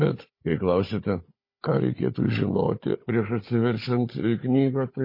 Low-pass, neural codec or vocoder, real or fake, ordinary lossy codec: 5.4 kHz; codec, 16 kHz, 16 kbps, FunCodec, trained on Chinese and English, 50 frames a second; fake; MP3, 24 kbps